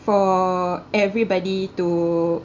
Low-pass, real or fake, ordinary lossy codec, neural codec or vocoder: 7.2 kHz; real; Opus, 64 kbps; none